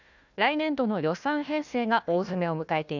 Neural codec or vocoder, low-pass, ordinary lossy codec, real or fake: codec, 16 kHz, 1 kbps, FunCodec, trained on Chinese and English, 50 frames a second; 7.2 kHz; none; fake